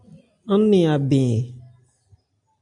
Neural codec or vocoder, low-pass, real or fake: none; 10.8 kHz; real